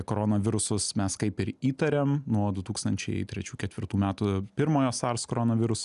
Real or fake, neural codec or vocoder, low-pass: real; none; 10.8 kHz